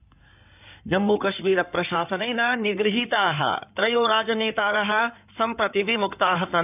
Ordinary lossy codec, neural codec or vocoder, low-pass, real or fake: none; codec, 16 kHz in and 24 kHz out, 2.2 kbps, FireRedTTS-2 codec; 3.6 kHz; fake